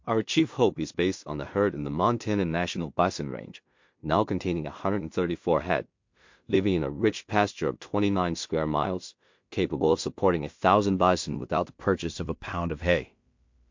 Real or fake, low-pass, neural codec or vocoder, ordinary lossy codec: fake; 7.2 kHz; codec, 16 kHz in and 24 kHz out, 0.4 kbps, LongCat-Audio-Codec, two codebook decoder; MP3, 48 kbps